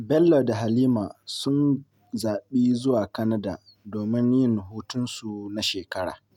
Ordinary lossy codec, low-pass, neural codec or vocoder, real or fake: none; 19.8 kHz; none; real